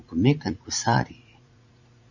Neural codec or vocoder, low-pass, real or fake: vocoder, 44.1 kHz, 80 mel bands, Vocos; 7.2 kHz; fake